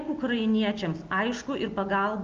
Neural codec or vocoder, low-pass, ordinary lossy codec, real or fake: none; 7.2 kHz; Opus, 24 kbps; real